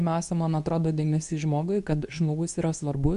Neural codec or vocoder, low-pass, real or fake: codec, 24 kHz, 0.9 kbps, WavTokenizer, medium speech release version 2; 10.8 kHz; fake